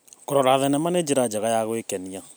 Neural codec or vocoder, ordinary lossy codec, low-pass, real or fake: none; none; none; real